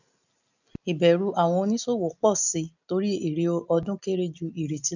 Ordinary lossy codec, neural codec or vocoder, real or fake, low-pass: none; none; real; 7.2 kHz